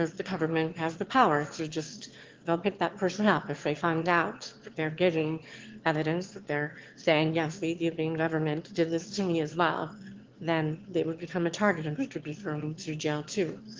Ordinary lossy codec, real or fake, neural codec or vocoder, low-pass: Opus, 16 kbps; fake; autoencoder, 22.05 kHz, a latent of 192 numbers a frame, VITS, trained on one speaker; 7.2 kHz